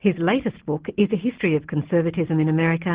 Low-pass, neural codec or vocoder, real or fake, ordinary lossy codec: 3.6 kHz; none; real; Opus, 16 kbps